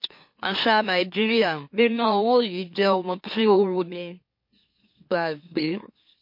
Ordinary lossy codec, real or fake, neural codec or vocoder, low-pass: MP3, 32 kbps; fake; autoencoder, 44.1 kHz, a latent of 192 numbers a frame, MeloTTS; 5.4 kHz